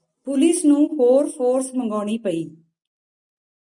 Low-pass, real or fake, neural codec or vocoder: 10.8 kHz; real; none